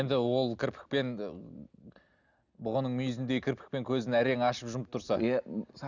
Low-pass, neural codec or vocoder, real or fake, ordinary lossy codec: 7.2 kHz; none; real; none